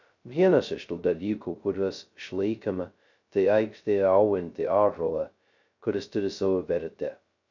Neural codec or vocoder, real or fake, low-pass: codec, 16 kHz, 0.2 kbps, FocalCodec; fake; 7.2 kHz